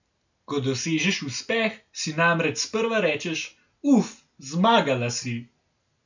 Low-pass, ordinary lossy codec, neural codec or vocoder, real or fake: 7.2 kHz; none; none; real